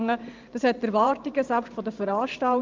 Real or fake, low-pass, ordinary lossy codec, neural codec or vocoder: fake; 7.2 kHz; Opus, 32 kbps; vocoder, 44.1 kHz, 128 mel bands every 512 samples, BigVGAN v2